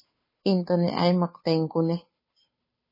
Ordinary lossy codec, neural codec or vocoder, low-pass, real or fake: MP3, 24 kbps; codec, 16 kHz, 2 kbps, FunCodec, trained on Chinese and English, 25 frames a second; 5.4 kHz; fake